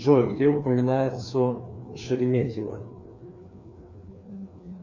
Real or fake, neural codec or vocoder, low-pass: fake; codec, 16 kHz, 2 kbps, FreqCodec, larger model; 7.2 kHz